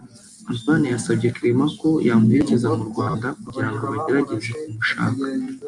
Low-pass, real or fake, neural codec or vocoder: 10.8 kHz; real; none